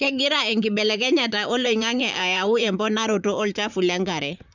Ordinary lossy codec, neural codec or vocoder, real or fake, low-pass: none; vocoder, 44.1 kHz, 128 mel bands, Pupu-Vocoder; fake; 7.2 kHz